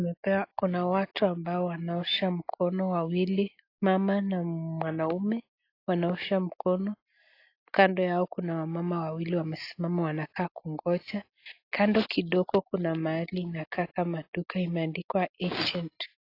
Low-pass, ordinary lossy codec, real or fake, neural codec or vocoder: 5.4 kHz; AAC, 32 kbps; real; none